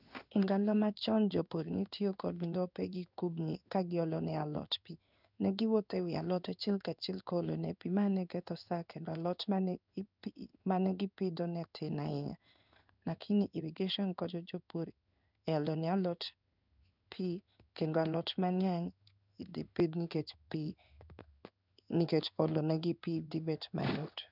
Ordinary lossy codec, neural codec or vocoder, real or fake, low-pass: none; codec, 16 kHz in and 24 kHz out, 1 kbps, XY-Tokenizer; fake; 5.4 kHz